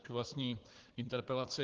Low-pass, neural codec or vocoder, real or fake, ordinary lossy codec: 7.2 kHz; codec, 16 kHz, 4 kbps, FunCodec, trained on Chinese and English, 50 frames a second; fake; Opus, 16 kbps